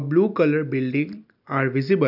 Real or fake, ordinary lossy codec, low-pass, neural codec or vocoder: real; none; 5.4 kHz; none